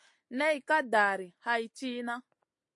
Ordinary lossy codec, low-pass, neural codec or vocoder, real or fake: MP3, 48 kbps; 10.8 kHz; none; real